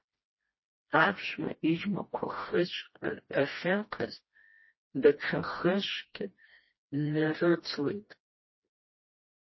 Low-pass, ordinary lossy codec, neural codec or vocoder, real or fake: 7.2 kHz; MP3, 24 kbps; codec, 16 kHz, 1 kbps, FreqCodec, smaller model; fake